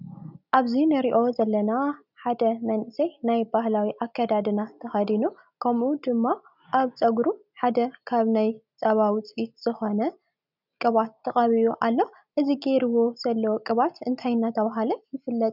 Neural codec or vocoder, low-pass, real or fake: none; 5.4 kHz; real